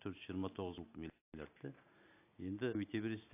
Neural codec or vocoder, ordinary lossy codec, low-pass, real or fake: none; AAC, 24 kbps; 3.6 kHz; real